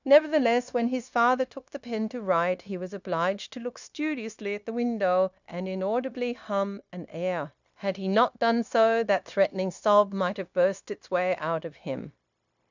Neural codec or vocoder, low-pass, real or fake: codec, 16 kHz, 0.9 kbps, LongCat-Audio-Codec; 7.2 kHz; fake